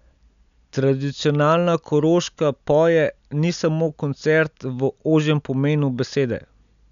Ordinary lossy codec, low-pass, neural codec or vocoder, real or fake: none; 7.2 kHz; none; real